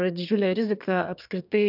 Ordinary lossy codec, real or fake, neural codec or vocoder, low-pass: AAC, 48 kbps; fake; codec, 32 kHz, 1.9 kbps, SNAC; 5.4 kHz